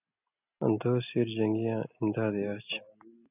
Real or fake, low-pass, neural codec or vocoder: real; 3.6 kHz; none